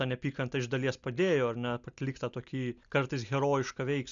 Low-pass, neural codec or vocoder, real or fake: 7.2 kHz; none; real